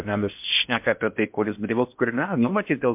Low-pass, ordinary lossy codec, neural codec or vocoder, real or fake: 3.6 kHz; MP3, 32 kbps; codec, 16 kHz in and 24 kHz out, 0.6 kbps, FocalCodec, streaming, 4096 codes; fake